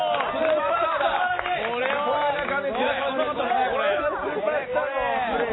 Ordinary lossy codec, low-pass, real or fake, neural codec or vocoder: AAC, 16 kbps; 7.2 kHz; real; none